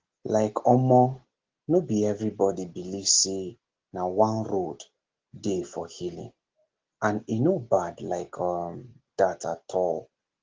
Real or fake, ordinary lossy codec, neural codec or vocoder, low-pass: real; Opus, 16 kbps; none; 7.2 kHz